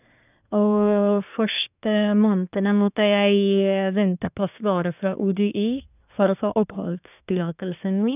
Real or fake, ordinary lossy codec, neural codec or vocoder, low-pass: fake; none; codec, 24 kHz, 1 kbps, SNAC; 3.6 kHz